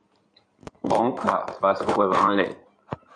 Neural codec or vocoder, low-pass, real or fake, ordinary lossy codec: codec, 16 kHz in and 24 kHz out, 2.2 kbps, FireRedTTS-2 codec; 9.9 kHz; fake; MP3, 64 kbps